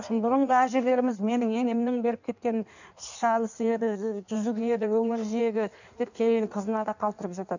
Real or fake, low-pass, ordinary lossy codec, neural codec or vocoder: fake; 7.2 kHz; none; codec, 16 kHz in and 24 kHz out, 1.1 kbps, FireRedTTS-2 codec